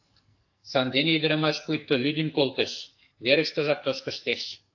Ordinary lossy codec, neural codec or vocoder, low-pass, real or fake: AAC, 48 kbps; codec, 44.1 kHz, 2.6 kbps, SNAC; 7.2 kHz; fake